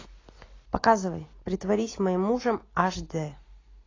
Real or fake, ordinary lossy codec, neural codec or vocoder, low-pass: real; AAC, 32 kbps; none; 7.2 kHz